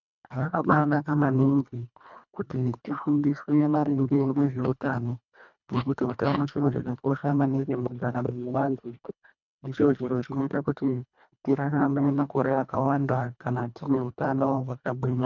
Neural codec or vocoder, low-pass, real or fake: codec, 24 kHz, 1.5 kbps, HILCodec; 7.2 kHz; fake